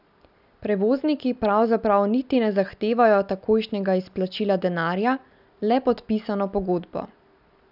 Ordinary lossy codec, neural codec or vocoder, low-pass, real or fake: none; none; 5.4 kHz; real